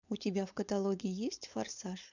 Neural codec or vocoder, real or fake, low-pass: codec, 16 kHz, 4.8 kbps, FACodec; fake; 7.2 kHz